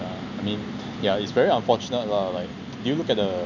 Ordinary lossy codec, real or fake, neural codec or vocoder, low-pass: none; real; none; 7.2 kHz